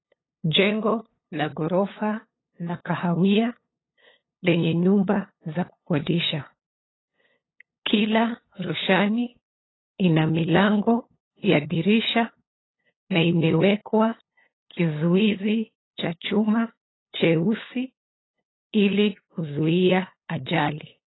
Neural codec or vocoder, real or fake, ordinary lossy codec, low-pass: codec, 16 kHz, 8 kbps, FunCodec, trained on LibriTTS, 25 frames a second; fake; AAC, 16 kbps; 7.2 kHz